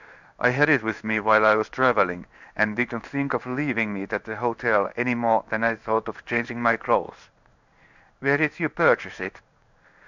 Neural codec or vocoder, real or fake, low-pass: codec, 16 kHz in and 24 kHz out, 1 kbps, XY-Tokenizer; fake; 7.2 kHz